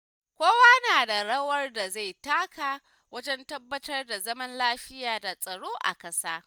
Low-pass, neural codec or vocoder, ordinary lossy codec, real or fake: none; none; none; real